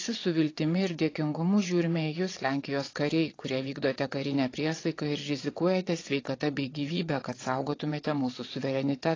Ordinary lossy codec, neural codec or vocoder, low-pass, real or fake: AAC, 32 kbps; vocoder, 22.05 kHz, 80 mel bands, WaveNeXt; 7.2 kHz; fake